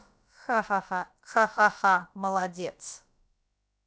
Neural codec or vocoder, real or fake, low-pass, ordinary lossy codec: codec, 16 kHz, about 1 kbps, DyCAST, with the encoder's durations; fake; none; none